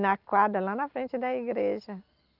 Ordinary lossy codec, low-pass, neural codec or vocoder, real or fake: Opus, 24 kbps; 5.4 kHz; none; real